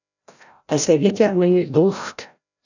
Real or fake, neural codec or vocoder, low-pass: fake; codec, 16 kHz, 0.5 kbps, FreqCodec, larger model; 7.2 kHz